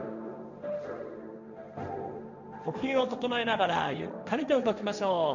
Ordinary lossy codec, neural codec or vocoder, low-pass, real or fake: none; codec, 16 kHz, 1.1 kbps, Voila-Tokenizer; 7.2 kHz; fake